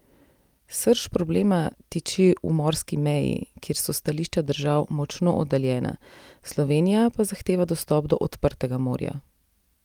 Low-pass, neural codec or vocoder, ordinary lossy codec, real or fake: 19.8 kHz; none; Opus, 32 kbps; real